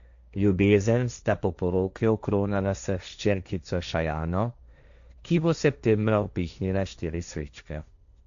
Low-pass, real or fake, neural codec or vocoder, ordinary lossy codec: 7.2 kHz; fake; codec, 16 kHz, 1.1 kbps, Voila-Tokenizer; AAC, 96 kbps